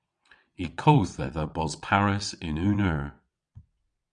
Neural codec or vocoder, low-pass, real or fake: vocoder, 22.05 kHz, 80 mel bands, WaveNeXt; 9.9 kHz; fake